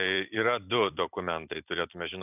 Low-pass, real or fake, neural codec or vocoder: 3.6 kHz; fake; vocoder, 44.1 kHz, 128 mel bands every 256 samples, BigVGAN v2